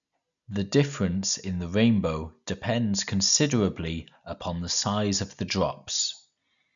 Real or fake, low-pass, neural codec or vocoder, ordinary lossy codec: real; 7.2 kHz; none; none